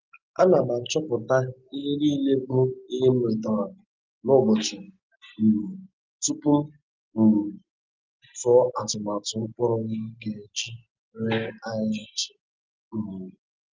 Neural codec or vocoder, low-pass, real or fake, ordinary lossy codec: none; 7.2 kHz; real; Opus, 24 kbps